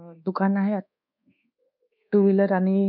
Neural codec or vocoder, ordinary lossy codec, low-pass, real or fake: codec, 24 kHz, 1.2 kbps, DualCodec; none; 5.4 kHz; fake